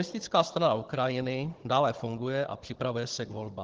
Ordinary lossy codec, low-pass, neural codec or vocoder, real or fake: Opus, 16 kbps; 7.2 kHz; codec, 16 kHz, 16 kbps, FunCodec, trained on Chinese and English, 50 frames a second; fake